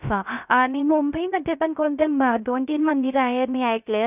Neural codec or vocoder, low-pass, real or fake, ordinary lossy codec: codec, 16 kHz, 0.3 kbps, FocalCodec; 3.6 kHz; fake; none